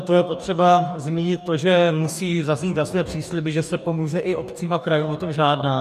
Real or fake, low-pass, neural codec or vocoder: fake; 14.4 kHz; codec, 44.1 kHz, 2.6 kbps, DAC